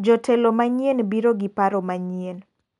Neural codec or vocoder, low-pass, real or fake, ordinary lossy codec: none; 10.8 kHz; real; none